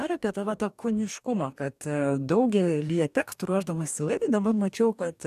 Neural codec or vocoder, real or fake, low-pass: codec, 44.1 kHz, 2.6 kbps, DAC; fake; 14.4 kHz